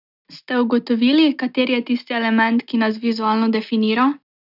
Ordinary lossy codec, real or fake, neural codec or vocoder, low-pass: none; real; none; 5.4 kHz